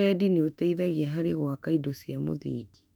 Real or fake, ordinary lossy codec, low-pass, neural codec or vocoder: fake; none; 19.8 kHz; autoencoder, 48 kHz, 32 numbers a frame, DAC-VAE, trained on Japanese speech